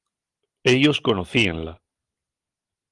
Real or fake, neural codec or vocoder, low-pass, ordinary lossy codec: real; none; 10.8 kHz; Opus, 24 kbps